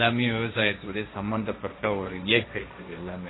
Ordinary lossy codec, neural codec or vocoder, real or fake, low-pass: AAC, 16 kbps; codec, 16 kHz, 1.1 kbps, Voila-Tokenizer; fake; 7.2 kHz